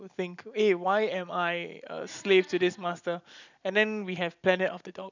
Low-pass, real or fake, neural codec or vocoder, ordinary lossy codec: 7.2 kHz; real; none; none